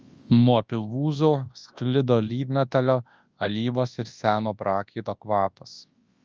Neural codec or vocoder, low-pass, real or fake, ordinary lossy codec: codec, 24 kHz, 0.9 kbps, WavTokenizer, large speech release; 7.2 kHz; fake; Opus, 24 kbps